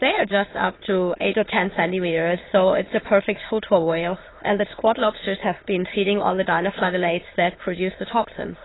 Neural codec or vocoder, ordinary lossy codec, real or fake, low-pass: autoencoder, 22.05 kHz, a latent of 192 numbers a frame, VITS, trained on many speakers; AAC, 16 kbps; fake; 7.2 kHz